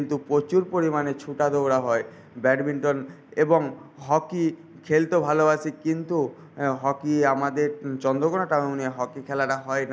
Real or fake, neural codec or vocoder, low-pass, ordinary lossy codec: real; none; none; none